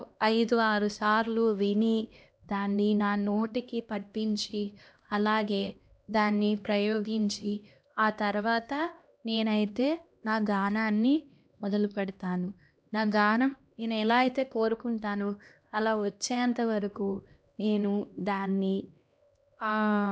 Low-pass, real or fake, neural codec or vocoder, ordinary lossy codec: none; fake; codec, 16 kHz, 1 kbps, X-Codec, HuBERT features, trained on LibriSpeech; none